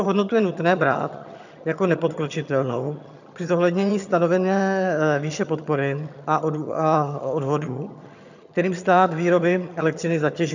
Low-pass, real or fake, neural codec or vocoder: 7.2 kHz; fake; vocoder, 22.05 kHz, 80 mel bands, HiFi-GAN